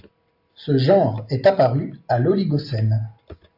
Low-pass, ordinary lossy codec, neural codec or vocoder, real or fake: 5.4 kHz; AAC, 48 kbps; none; real